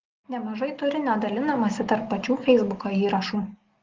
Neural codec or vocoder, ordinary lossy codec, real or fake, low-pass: none; Opus, 16 kbps; real; 7.2 kHz